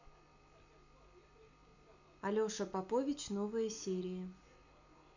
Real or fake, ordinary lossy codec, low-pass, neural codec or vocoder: real; none; 7.2 kHz; none